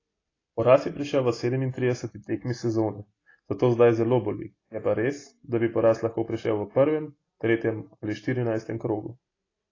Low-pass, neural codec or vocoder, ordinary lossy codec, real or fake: 7.2 kHz; none; AAC, 32 kbps; real